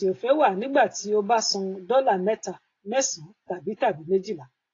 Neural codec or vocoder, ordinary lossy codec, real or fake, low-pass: none; AAC, 32 kbps; real; 7.2 kHz